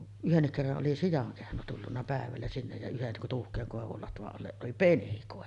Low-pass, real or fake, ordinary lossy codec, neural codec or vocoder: 10.8 kHz; real; Opus, 64 kbps; none